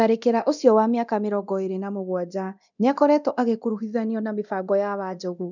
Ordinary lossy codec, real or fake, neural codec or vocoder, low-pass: none; fake; codec, 24 kHz, 0.9 kbps, DualCodec; 7.2 kHz